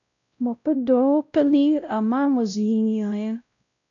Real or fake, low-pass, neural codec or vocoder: fake; 7.2 kHz; codec, 16 kHz, 0.5 kbps, X-Codec, WavLM features, trained on Multilingual LibriSpeech